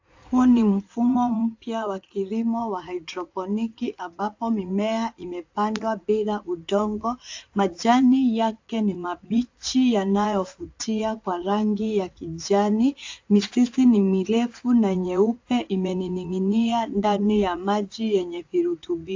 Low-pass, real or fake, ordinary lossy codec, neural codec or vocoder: 7.2 kHz; fake; AAC, 48 kbps; vocoder, 44.1 kHz, 80 mel bands, Vocos